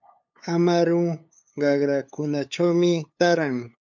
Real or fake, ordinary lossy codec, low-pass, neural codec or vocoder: fake; MP3, 64 kbps; 7.2 kHz; codec, 16 kHz, 8 kbps, FunCodec, trained on LibriTTS, 25 frames a second